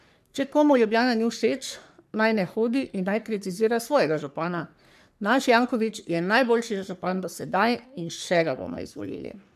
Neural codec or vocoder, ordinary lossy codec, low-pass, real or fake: codec, 44.1 kHz, 3.4 kbps, Pupu-Codec; none; 14.4 kHz; fake